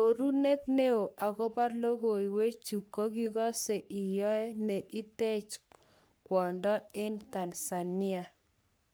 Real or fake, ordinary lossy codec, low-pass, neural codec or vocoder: fake; none; none; codec, 44.1 kHz, 3.4 kbps, Pupu-Codec